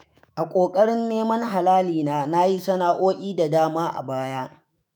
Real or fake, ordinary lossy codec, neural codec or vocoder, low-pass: fake; none; autoencoder, 48 kHz, 128 numbers a frame, DAC-VAE, trained on Japanese speech; none